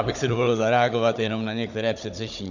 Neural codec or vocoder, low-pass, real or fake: codec, 16 kHz, 16 kbps, FunCodec, trained on Chinese and English, 50 frames a second; 7.2 kHz; fake